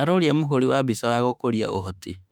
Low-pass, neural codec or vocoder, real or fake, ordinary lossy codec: 19.8 kHz; autoencoder, 48 kHz, 32 numbers a frame, DAC-VAE, trained on Japanese speech; fake; none